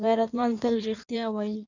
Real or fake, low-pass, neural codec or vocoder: fake; 7.2 kHz; codec, 16 kHz in and 24 kHz out, 1.1 kbps, FireRedTTS-2 codec